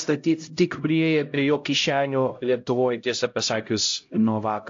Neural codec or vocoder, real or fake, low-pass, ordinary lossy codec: codec, 16 kHz, 0.5 kbps, X-Codec, HuBERT features, trained on LibriSpeech; fake; 7.2 kHz; AAC, 48 kbps